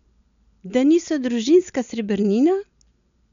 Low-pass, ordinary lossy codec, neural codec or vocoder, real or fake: 7.2 kHz; none; none; real